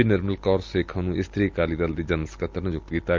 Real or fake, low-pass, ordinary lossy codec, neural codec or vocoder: real; 7.2 kHz; Opus, 32 kbps; none